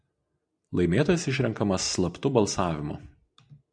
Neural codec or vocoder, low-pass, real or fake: none; 9.9 kHz; real